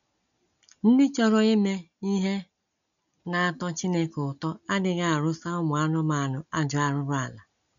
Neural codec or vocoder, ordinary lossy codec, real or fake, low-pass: none; none; real; 7.2 kHz